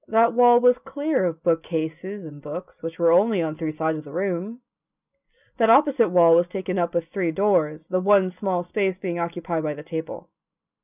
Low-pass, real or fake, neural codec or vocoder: 3.6 kHz; real; none